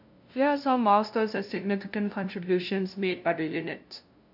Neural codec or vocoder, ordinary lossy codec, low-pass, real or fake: codec, 16 kHz, 0.5 kbps, FunCodec, trained on LibriTTS, 25 frames a second; AAC, 48 kbps; 5.4 kHz; fake